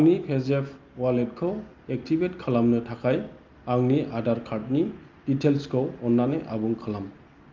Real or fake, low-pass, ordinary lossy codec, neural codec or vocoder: real; 7.2 kHz; Opus, 24 kbps; none